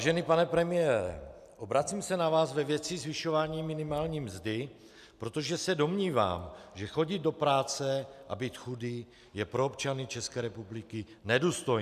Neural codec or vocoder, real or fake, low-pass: none; real; 14.4 kHz